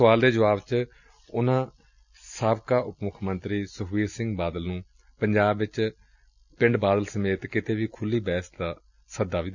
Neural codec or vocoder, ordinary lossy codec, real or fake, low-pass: none; none; real; 7.2 kHz